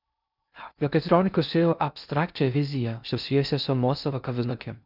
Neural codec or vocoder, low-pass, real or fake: codec, 16 kHz in and 24 kHz out, 0.6 kbps, FocalCodec, streaming, 2048 codes; 5.4 kHz; fake